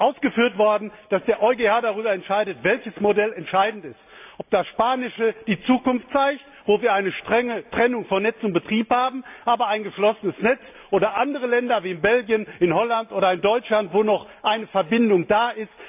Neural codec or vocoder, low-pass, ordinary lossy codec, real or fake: none; 3.6 kHz; AAC, 32 kbps; real